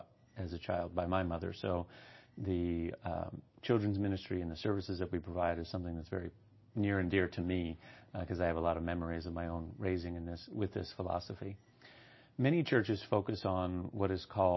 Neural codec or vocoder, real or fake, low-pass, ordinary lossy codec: none; real; 7.2 kHz; MP3, 24 kbps